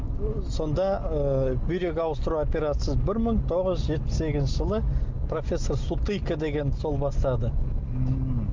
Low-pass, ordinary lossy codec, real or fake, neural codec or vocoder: 7.2 kHz; Opus, 32 kbps; real; none